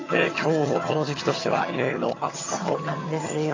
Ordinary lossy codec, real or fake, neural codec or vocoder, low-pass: AAC, 32 kbps; fake; vocoder, 22.05 kHz, 80 mel bands, HiFi-GAN; 7.2 kHz